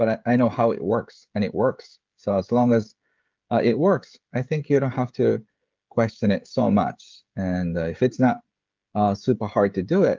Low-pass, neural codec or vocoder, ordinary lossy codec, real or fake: 7.2 kHz; codec, 16 kHz, 16 kbps, FreqCodec, smaller model; Opus, 24 kbps; fake